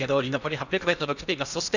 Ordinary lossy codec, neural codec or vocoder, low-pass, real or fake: none; codec, 16 kHz in and 24 kHz out, 0.8 kbps, FocalCodec, streaming, 65536 codes; 7.2 kHz; fake